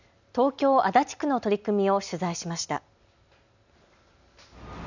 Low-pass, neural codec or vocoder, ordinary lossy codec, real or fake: 7.2 kHz; none; none; real